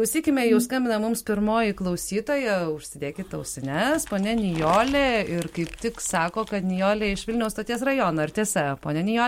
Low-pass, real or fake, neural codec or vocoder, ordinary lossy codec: 19.8 kHz; real; none; MP3, 64 kbps